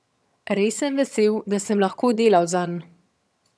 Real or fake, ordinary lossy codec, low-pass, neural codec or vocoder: fake; none; none; vocoder, 22.05 kHz, 80 mel bands, HiFi-GAN